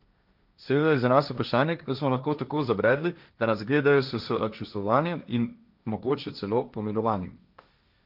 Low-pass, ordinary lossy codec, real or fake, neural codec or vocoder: 5.4 kHz; none; fake; codec, 16 kHz, 1.1 kbps, Voila-Tokenizer